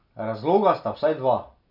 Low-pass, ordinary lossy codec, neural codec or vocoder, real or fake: 5.4 kHz; none; none; real